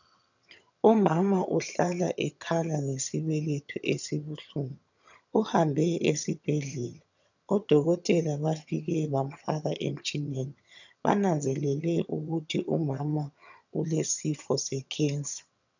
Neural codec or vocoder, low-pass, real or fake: vocoder, 22.05 kHz, 80 mel bands, HiFi-GAN; 7.2 kHz; fake